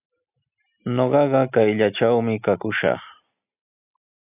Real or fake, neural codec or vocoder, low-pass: real; none; 3.6 kHz